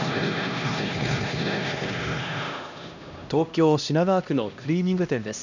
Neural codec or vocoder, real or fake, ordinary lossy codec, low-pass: codec, 16 kHz, 1 kbps, X-Codec, HuBERT features, trained on LibriSpeech; fake; none; 7.2 kHz